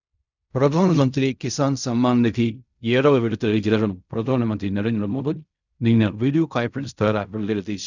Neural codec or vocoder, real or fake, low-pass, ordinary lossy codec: codec, 16 kHz in and 24 kHz out, 0.4 kbps, LongCat-Audio-Codec, fine tuned four codebook decoder; fake; 7.2 kHz; none